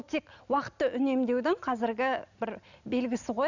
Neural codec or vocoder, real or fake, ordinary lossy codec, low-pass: none; real; none; 7.2 kHz